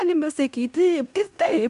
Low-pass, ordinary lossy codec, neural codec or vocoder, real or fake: 10.8 kHz; MP3, 96 kbps; codec, 16 kHz in and 24 kHz out, 0.9 kbps, LongCat-Audio-Codec, fine tuned four codebook decoder; fake